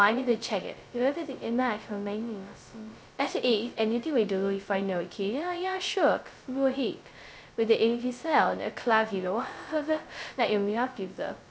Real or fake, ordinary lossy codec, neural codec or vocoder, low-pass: fake; none; codec, 16 kHz, 0.2 kbps, FocalCodec; none